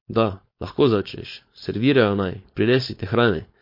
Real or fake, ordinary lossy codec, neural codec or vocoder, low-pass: fake; MP3, 32 kbps; codec, 16 kHz, 4.8 kbps, FACodec; 5.4 kHz